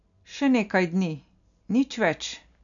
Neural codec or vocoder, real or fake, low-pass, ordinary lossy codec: none; real; 7.2 kHz; none